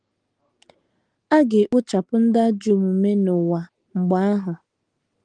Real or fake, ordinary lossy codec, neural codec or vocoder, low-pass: real; Opus, 24 kbps; none; 9.9 kHz